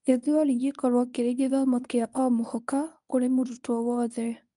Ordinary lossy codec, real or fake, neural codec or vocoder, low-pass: none; fake; codec, 24 kHz, 0.9 kbps, WavTokenizer, medium speech release version 1; 10.8 kHz